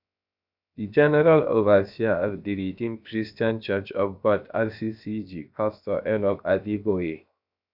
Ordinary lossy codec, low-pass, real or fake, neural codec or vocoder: none; 5.4 kHz; fake; codec, 16 kHz, 0.7 kbps, FocalCodec